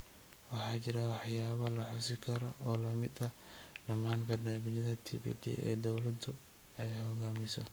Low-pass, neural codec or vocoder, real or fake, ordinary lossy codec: none; codec, 44.1 kHz, 7.8 kbps, Pupu-Codec; fake; none